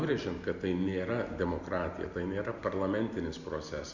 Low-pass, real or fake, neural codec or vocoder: 7.2 kHz; real; none